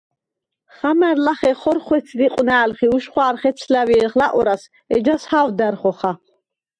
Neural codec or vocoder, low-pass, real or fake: none; 9.9 kHz; real